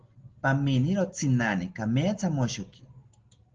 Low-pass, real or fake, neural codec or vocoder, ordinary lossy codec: 7.2 kHz; real; none; Opus, 16 kbps